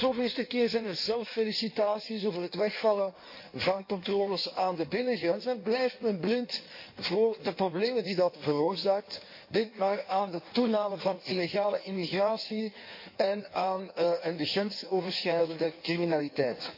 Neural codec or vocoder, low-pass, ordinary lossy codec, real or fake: codec, 16 kHz in and 24 kHz out, 1.1 kbps, FireRedTTS-2 codec; 5.4 kHz; MP3, 32 kbps; fake